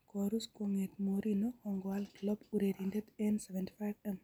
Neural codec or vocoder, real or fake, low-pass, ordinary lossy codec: none; real; none; none